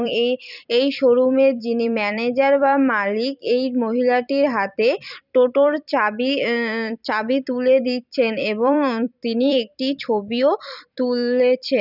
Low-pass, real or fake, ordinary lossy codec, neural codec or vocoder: 5.4 kHz; fake; none; vocoder, 44.1 kHz, 128 mel bands every 256 samples, BigVGAN v2